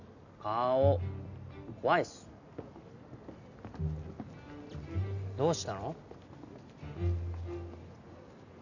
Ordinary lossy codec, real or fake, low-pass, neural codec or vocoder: none; real; 7.2 kHz; none